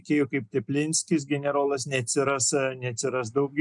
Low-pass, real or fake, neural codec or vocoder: 10.8 kHz; real; none